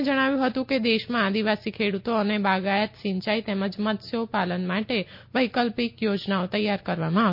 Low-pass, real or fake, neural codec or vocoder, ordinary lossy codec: 5.4 kHz; real; none; MP3, 32 kbps